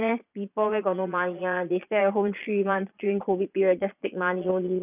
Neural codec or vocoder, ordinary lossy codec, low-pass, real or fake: vocoder, 22.05 kHz, 80 mel bands, Vocos; none; 3.6 kHz; fake